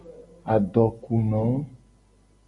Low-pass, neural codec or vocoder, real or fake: 10.8 kHz; none; real